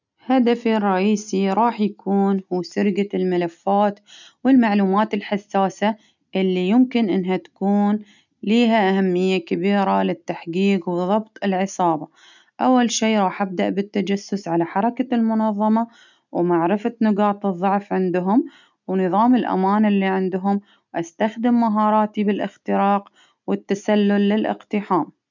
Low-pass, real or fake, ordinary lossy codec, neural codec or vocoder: 7.2 kHz; real; none; none